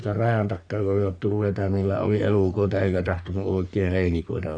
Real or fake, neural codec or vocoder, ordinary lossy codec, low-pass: fake; codec, 44.1 kHz, 3.4 kbps, Pupu-Codec; none; 9.9 kHz